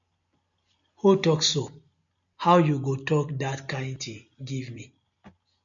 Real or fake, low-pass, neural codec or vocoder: real; 7.2 kHz; none